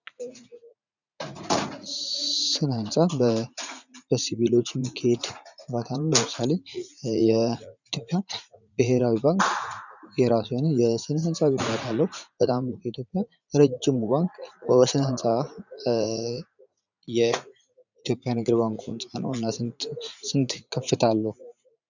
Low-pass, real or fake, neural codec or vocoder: 7.2 kHz; fake; vocoder, 44.1 kHz, 80 mel bands, Vocos